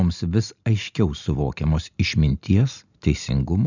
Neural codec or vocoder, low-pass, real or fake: none; 7.2 kHz; real